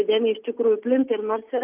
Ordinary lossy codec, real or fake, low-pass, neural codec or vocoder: Opus, 24 kbps; real; 3.6 kHz; none